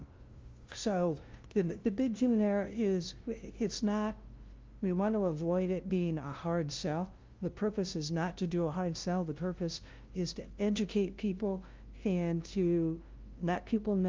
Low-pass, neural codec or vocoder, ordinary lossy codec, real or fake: 7.2 kHz; codec, 16 kHz, 0.5 kbps, FunCodec, trained on LibriTTS, 25 frames a second; Opus, 32 kbps; fake